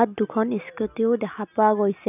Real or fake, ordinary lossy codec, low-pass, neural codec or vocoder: real; none; 3.6 kHz; none